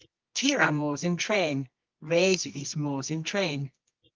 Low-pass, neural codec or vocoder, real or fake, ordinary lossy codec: 7.2 kHz; codec, 24 kHz, 0.9 kbps, WavTokenizer, medium music audio release; fake; Opus, 24 kbps